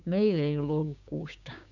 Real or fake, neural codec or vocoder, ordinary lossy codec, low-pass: fake; codec, 16 kHz, 6 kbps, DAC; none; 7.2 kHz